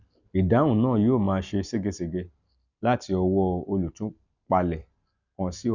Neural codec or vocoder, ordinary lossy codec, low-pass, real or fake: none; none; 7.2 kHz; real